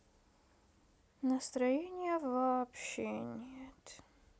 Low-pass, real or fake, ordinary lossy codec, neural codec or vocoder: none; real; none; none